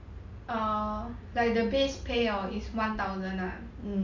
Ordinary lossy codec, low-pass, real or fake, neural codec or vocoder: none; 7.2 kHz; real; none